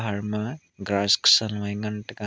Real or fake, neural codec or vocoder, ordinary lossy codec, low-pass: real; none; none; none